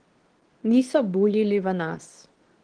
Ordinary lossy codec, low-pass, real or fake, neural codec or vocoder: Opus, 16 kbps; 9.9 kHz; fake; codec, 24 kHz, 0.9 kbps, WavTokenizer, medium speech release version 2